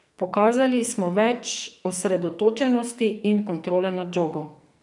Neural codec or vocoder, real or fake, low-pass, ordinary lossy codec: codec, 44.1 kHz, 2.6 kbps, SNAC; fake; 10.8 kHz; none